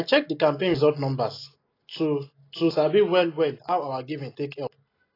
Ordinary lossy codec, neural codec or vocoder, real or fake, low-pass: AAC, 24 kbps; none; real; 5.4 kHz